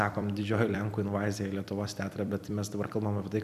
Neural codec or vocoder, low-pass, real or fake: vocoder, 44.1 kHz, 128 mel bands every 256 samples, BigVGAN v2; 14.4 kHz; fake